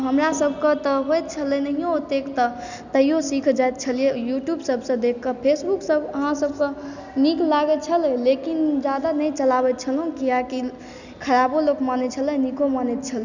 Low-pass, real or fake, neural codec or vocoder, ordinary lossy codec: 7.2 kHz; real; none; none